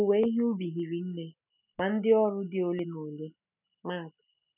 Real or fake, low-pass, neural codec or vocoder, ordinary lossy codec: real; 3.6 kHz; none; none